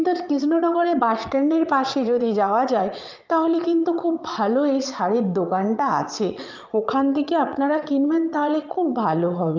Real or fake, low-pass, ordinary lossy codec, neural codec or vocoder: fake; 7.2 kHz; Opus, 24 kbps; vocoder, 22.05 kHz, 80 mel bands, Vocos